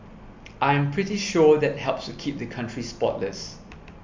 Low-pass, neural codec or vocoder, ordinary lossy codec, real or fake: 7.2 kHz; none; MP3, 64 kbps; real